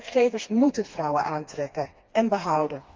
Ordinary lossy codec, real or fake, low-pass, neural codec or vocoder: Opus, 32 kbps; fake; 7.2 kHz; codec, 16 kHz, 2 kbps, FreqCodec, smaller model